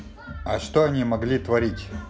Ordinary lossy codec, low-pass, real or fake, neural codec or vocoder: none; none; real; none